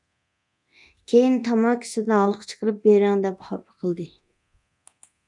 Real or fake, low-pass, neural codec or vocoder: fake; 10.8 kHz; codec, 24 kHz, 0.9 kbps, DualCodec